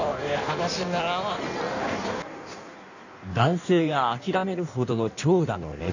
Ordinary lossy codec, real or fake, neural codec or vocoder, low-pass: none; fake; codec, 16 kHz in and 24 kHz out, 1.1 kbps, FireRedTTS-2 codec; 7.2 kHz